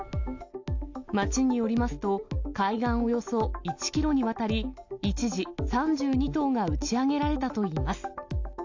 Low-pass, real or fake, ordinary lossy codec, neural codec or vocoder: 7.2 kHz; real; AAC, 48 kbps; none